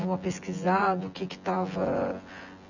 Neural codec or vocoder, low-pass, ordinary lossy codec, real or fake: vocoder, 24 kHz, 100 mel bands, Vocos; 7.2 kHz; none; fake